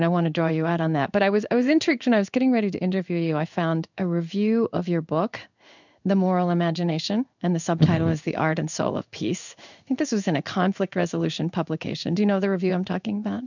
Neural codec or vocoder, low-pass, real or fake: codec, 16 kHz in and 24 kHz out, 1 kbps, XY-Tokenizer; 7.2 kHz; fake